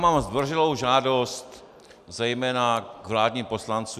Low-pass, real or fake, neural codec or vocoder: 14.4 kHz; real; none